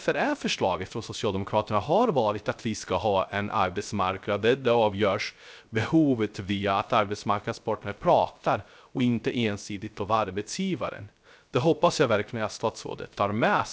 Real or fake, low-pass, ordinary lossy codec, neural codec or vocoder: fake; none; none; codec, 16 kHz, 0.3 kbps, FocalCodec